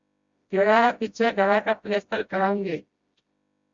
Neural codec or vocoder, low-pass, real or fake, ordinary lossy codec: codec, 16 kHz, 0.5 kbps, FreqCodec, smaller model; 7.2 kHz; fake; Opus, 64 kbps